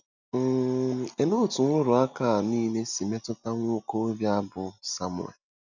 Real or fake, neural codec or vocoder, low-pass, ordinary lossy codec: real; none; 7.2 kHz; none